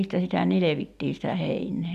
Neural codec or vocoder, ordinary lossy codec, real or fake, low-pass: none; none; real; 14.4 kHz